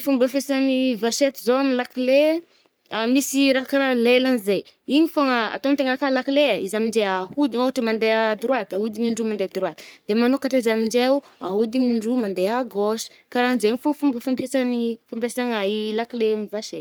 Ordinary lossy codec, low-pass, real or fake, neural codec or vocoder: none; none; fake; codec, 44.1 kHz, 3.4 kbps, Pupu-Codec